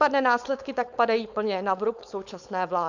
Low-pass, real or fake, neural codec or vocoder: 7.2 kHz; fake; codec, 16 kHz, 4.8 kbps, FACodec